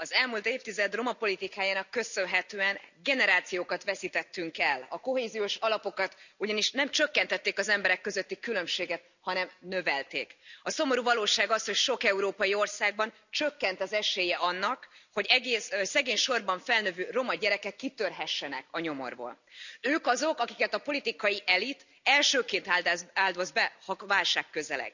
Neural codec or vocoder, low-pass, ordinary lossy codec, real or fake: none; 7.2 kHz; none; real